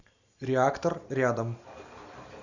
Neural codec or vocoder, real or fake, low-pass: none; real; 7.2 kHz